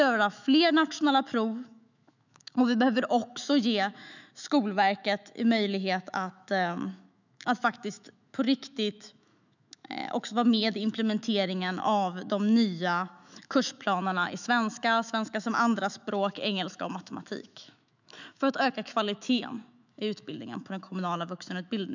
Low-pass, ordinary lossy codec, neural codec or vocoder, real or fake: 7.2 kHz; none; autoencoder, 48 kHz, 128 numbers a frame, DAC-VAE, trained on Japanese speech; fake